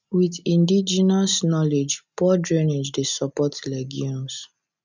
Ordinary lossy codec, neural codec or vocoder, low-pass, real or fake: none; none; 7.2 kHz; real